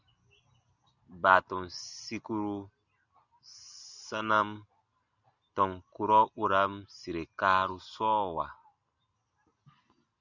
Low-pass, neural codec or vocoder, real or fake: 7.2 kHz; none; real